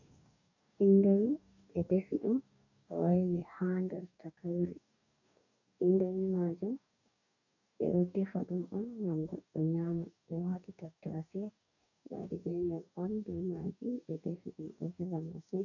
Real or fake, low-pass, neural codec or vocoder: fake; 7.2 kHz; codec, 44.1 kHz, 2.6 kbps, DAC